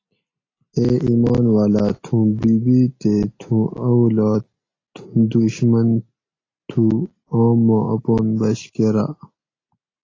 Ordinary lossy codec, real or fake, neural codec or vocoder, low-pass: AAC, 32 kbps; real; none; 7.2 kHz